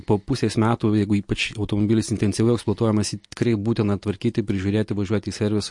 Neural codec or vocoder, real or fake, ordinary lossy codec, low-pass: none; real; MP3, 48 kbps; 10.8 kHz